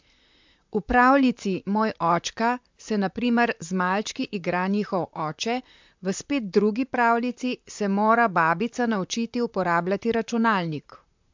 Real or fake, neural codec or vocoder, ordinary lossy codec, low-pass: fake; vocoder, 44.1 kHz, 128 mel bands, Pupu-Vocoder; MP3, 64 kbps; 7.2 kHz